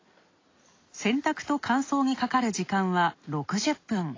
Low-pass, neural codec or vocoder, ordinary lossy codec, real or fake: 7.2 kHz; none; AAC, 32 kbps; real